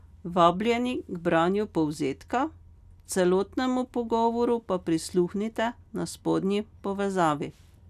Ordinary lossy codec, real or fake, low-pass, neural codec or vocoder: none; real; 14.4 kHz; none